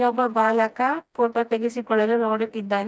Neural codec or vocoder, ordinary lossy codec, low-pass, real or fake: codec, 16 kHz, 1 kbps, FreqCodec, smaller model; none; none; fake